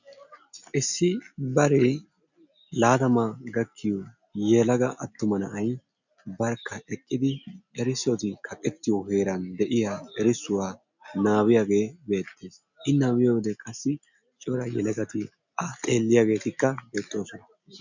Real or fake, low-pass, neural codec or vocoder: real; 7.2 kHz; none